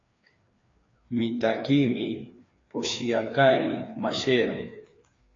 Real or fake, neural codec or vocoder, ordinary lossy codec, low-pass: fake; codec, 16 kHz, 2 kbps, FreqCodec, larger model; AAC, 32 kbps; 7.2 kHz